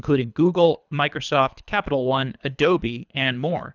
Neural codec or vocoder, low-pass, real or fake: codec, 24 kHz, 3 kbps, HILCodec; 7.2 kHz; fake